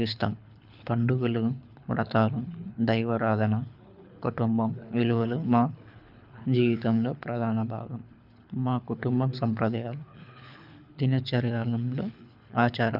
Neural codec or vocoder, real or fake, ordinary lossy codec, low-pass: codec, 24 kHz, 6 kbps, HILCodec; fake; none; 5.4 kHz